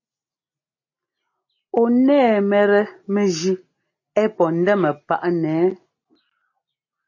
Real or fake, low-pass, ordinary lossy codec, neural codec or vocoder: real; 7.2 kHz; MP3, 32 kbps; none